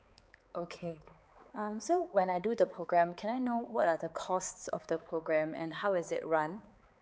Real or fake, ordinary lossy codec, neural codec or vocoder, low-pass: fake; none; codec, 16 kHz, 4 kbps, X-Codec, HuBERT features, trained on balanced general audio; none